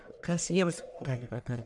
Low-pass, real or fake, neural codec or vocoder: 10.8 kHz; fake; codec, 44.1 kHz, 1.7 kbps, Pupu-Codec